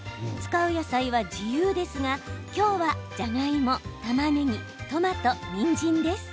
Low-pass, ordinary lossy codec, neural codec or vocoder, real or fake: none; none; none; real